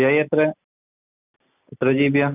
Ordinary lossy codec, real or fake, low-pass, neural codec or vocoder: none; real; 3.6 kHz; none